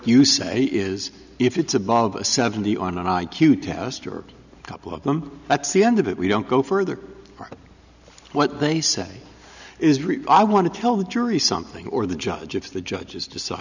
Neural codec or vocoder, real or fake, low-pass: none; real; 7.2 kHz